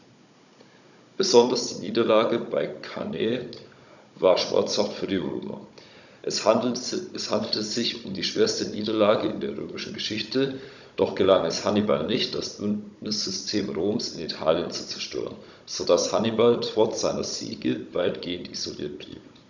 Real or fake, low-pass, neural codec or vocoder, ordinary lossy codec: fake; 7.2 kHz; codec, 16 kHz, 16 kbps, FunCodec, trained on Chinese and English, 50 frames a second; none